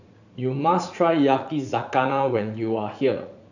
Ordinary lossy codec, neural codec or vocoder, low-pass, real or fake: none; vocoder, 44.1 kHz, 80 mel bands, Vocos; 7.2 kHz; fake